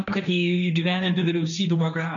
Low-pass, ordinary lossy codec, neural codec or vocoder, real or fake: 7.2 kHz; MP3, 96 kbps; codec, 16 kHz, 1.1 kbps, Voila-Tokenizer; fake